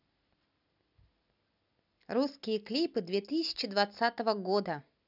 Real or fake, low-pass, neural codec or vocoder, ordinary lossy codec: real; 5.4 kHz; none; none